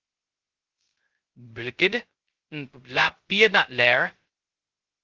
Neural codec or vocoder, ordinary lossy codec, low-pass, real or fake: codec, 16 kHz, 0.2 kbps, FocalCodec; Opus, 16 kbps; 7.2 kHz; fake